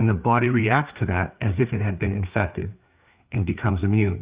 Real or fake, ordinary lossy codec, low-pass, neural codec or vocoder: fake; Opus, 64 kbps; 3.6 kHz; codec, 16 kHz, 4 kbps, FreqCodec, larger model